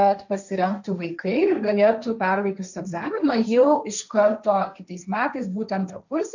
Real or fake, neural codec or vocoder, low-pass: fake; codec, 16 kHz, 1.1 kbps, Voila-Tokenizer; 7.2 kHz